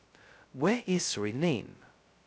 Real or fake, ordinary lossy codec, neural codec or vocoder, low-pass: fake; none; codec, 16 kHz, 0.2 kbps, FocalCodec; none